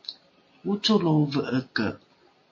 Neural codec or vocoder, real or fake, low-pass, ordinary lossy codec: none; real; 7.2 kHz; MP3, 32 kbps